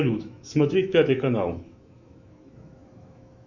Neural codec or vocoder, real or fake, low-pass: autoencoder, 48 kHz, 128 numbers a frame, DAC-VAE, trained on Japanese speech; fake; 7.2 kHz